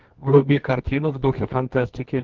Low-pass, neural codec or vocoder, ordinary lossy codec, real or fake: 7.2 kHz; codec, 24 kHz, 0.9 kbps, WavTokenizer, medium music audio release; Opus, 16 kbps; fake